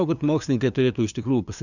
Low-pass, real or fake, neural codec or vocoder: 7.2 kHz; fake; codec, 16 kHz, 2 kbps, FunCodec, trained on LibriTTS, 25 frames a second